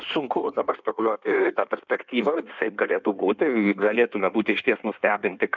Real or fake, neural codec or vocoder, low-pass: fake; codec, 16 kHz in and 24 kHz out, 1.1 kbps, FireRedTTS-2 codec; 7.2 kHz